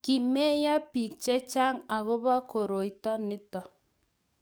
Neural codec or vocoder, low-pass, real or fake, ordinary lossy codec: codec, 44.1 kHz, 7.8 kbps, DAC; none; fake; none